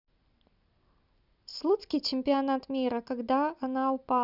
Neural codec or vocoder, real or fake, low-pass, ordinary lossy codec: none; real; 5.4 kHz; none